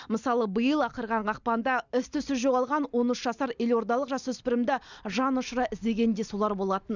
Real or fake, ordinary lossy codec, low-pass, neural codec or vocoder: real; none; 7.2 kHz; none